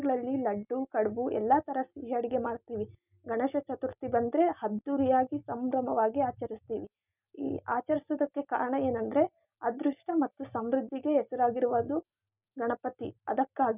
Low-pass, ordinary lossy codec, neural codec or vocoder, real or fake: 3.6 kHz; none; none; real